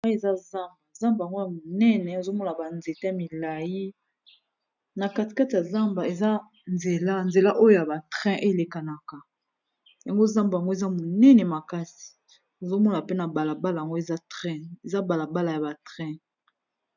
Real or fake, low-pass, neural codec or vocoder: real; 7.2 kHz; none